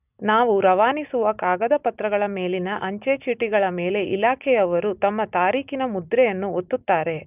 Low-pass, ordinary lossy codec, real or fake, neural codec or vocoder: 3.6 kHz; none; real; none